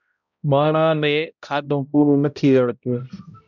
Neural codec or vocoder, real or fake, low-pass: codec, 16 kHz, 0.5 kbps, X-Codec, HuBERT features, trained on balanced general audio; fake; 7.2 kHz